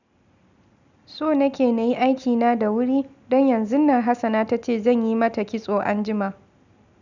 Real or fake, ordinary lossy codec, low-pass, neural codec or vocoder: real; none; 7.2 kHz; none